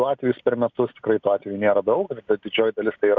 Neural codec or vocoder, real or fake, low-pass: none; real; 7.2 kHz